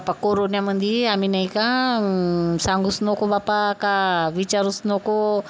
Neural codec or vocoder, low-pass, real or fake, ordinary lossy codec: none; none; real; none